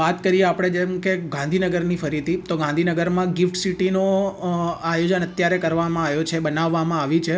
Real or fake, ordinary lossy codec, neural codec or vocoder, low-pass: real; none; none; none